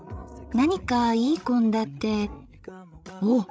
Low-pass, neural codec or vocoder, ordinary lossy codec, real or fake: none; codec, 16 kHz, 16 kbps, FreqCodec, larger model; none; fake